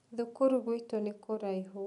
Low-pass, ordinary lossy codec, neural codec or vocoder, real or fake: 10.8 kHz; none; none; real